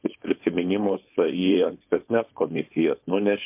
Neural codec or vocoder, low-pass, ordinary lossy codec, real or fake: codec, 16 kHz, 4.8 kbps, FACodec; 3.6 kHz; MP3, 32 kbps; fake